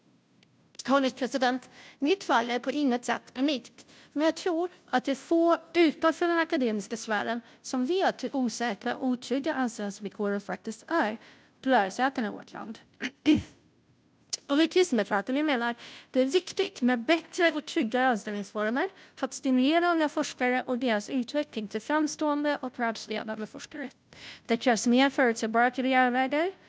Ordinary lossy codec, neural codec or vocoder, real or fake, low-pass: none; codec, 16 kHz, 0.5 kbps, FunCodec, trained on Chinese and English, 25 frames a second; fake; none